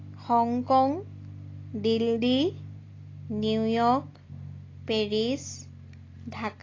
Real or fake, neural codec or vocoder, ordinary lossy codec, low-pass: real; none; AAC, 32 kbps; 7.2 kHz